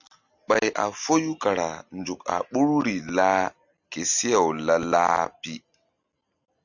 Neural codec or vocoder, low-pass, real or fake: none; 7.2 kHz; real